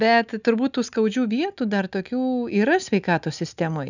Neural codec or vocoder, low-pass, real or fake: none; 7.2 kHz; real